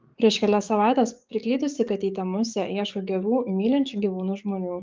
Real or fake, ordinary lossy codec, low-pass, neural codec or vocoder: fake; Opus, 24 kbps; 7.2 kHz; codec, 44.1 kHz, 7.8 kbps, DAC